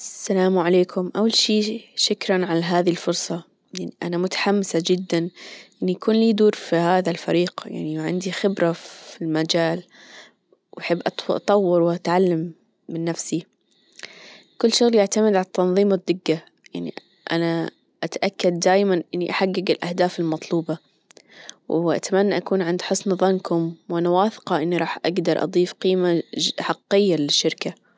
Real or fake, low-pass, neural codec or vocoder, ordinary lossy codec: real; none; none; none